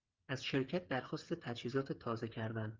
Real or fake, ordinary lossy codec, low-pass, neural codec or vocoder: fake; Opus, 32 kbps; 7.2 kHz; codec, 16 kHz, 8 kbps, FreqCodec, smaller model